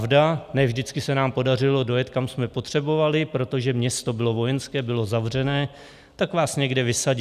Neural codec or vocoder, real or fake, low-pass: none; real; 14.4 kHz